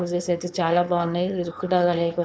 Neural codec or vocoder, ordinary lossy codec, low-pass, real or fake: codec, 16 kHz, 4.8 kbps, FACodec; none; none; fake